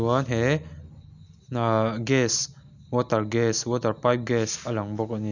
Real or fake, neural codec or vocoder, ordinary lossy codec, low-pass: real; none; none; 7.2 kHz